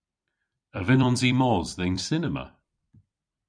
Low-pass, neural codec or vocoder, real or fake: 9.9 kHz; vocoder, 44.1 kHz, 128 mel bands every 256 samples, BigVGAN v2; fake